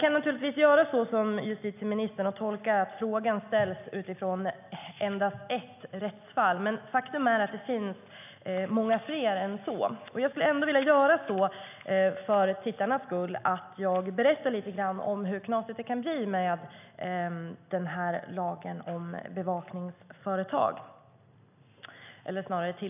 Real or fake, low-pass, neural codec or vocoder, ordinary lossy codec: real; 3.6 kHz; none; none